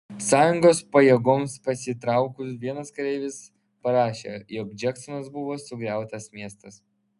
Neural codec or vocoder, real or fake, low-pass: none; real; 10.8 kHz